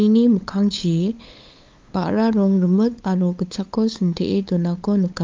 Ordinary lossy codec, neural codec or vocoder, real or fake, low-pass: Opus, 24 kbps; codec, 16 kHz, 8 kbps, FunCodec, trained on LibriTTS, 25 frames a second; fake; 7.2 kHz